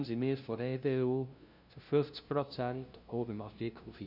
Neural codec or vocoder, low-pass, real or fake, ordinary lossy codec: codec, 16 kHz, 0.5 kbps, FunCodec, trained on LibriTTS, 25 frames a second; 5.4 kHz; fake; none